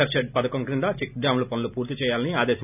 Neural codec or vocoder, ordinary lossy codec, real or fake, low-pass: none; none; real; 3.6 kHz